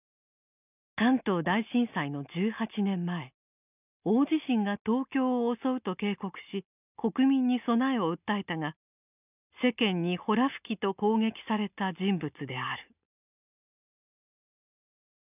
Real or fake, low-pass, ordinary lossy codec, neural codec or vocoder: real; 3.6 kHz; none; none